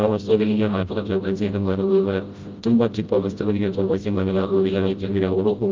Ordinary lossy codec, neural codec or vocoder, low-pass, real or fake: Opus, 24 kbps; codec, 16 kHz, 0.5 kbps, FreqCodec, smaller model; 7.2 kHz; fake